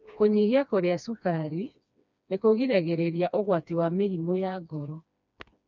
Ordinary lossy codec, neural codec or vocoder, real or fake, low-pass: none; codec, 16 kHz, 2 kbps, FreqCodec, smaller model; fake; 7.2 kHz